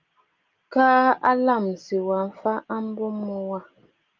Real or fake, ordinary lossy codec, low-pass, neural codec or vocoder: real; Opus, 32 kbps; 7.2 kHz; none